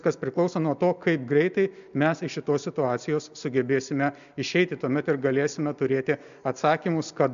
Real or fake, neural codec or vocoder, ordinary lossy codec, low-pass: real; none; AAC, 96 kbps; 7.2 kHz